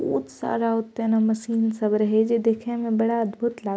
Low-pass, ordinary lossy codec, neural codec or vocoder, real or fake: none; none; none; real